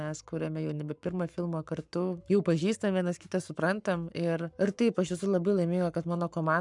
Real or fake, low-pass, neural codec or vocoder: fake; 10.8 kHz; codec, 44.1 kHz, 7.8 kbps, Pupu-Codec